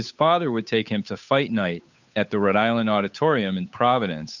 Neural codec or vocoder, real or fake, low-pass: codec, 16 kHz, 8 kbps, FunCodec, trained on Chinese and English, 25 frames a second; fake; 7.2 kHz